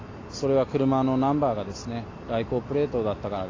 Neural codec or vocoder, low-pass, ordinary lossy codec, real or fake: none; 7.2 kHz; AAC, 32 kbps; real